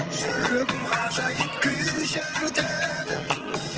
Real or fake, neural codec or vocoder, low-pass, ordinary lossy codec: fake; vocoder, 22.05 kHz, 80 mel bands, HiFi-GAN; 7.2 kHz; Opus, 16 kbps